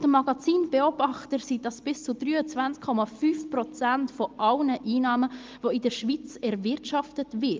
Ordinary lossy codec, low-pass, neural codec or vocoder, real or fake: Opus, 32 kbps; 7.2 kHz; none; real